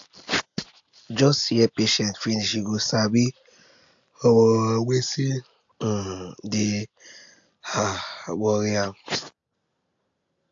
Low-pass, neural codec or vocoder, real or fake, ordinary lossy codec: 7.2 kHz; none; real; AAC, 64 kbps